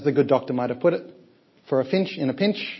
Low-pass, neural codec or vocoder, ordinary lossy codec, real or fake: 7.2 kHz; none; MP3, 24 kbps; real